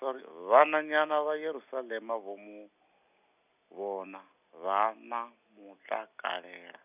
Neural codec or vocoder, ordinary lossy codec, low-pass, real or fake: none; none; 3.6 kHz; real